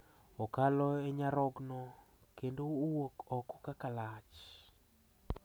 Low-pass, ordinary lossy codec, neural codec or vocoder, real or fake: none; none; none; real